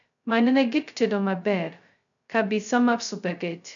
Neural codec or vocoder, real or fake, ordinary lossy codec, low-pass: codec, 16 kHz, 0.2 kbps, FocalCodec; fake; none; 7.2 kHz